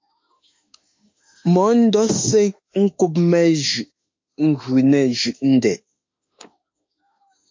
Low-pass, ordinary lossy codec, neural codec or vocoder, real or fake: 7.2 kHz; MP3, 48 kbps; autoencoder, 48 kHz, 32 numbers a frame, DAC-VAE, trained on Japanese speech; fake